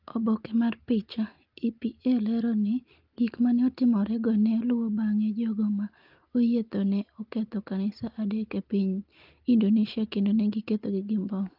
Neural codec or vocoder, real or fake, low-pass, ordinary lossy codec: none; real; 5.4 kHz; Opus, 32 kbps